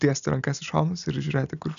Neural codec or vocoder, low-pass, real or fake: none; 7.2 kHz; real